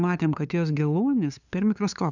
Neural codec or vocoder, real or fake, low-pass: codec, 16 kHz, 2 kbps, FunCodec, trained on LibriTTS, 25 frames a second; fake; 7.2 kHz